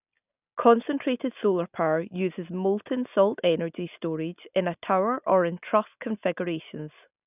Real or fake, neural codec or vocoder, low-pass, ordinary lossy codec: real; none; 3.6 kHz; none